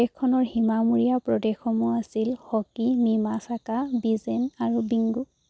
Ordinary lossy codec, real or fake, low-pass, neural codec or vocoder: none; real; none; none